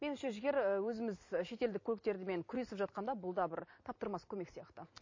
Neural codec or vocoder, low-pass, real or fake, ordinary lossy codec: vocoder, 44.1 kHz, 128 mel bands every 256 samples, BigVGAN v2; 7.2 kHz; fake; MP3, 32 kbps